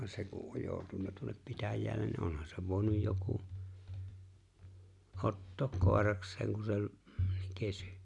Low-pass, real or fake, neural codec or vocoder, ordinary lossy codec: 10.8 kHz; real; none; none